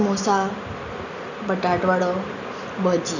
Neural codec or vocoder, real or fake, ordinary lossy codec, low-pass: none; real; none; 7.2 kHz